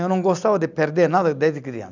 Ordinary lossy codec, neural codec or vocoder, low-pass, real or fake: none; none; 7.2 kHz; real